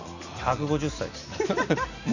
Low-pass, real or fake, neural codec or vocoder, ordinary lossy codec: 7.2 kHz; real; none; none